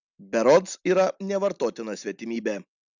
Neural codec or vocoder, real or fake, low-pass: none; real; 7.2 kHz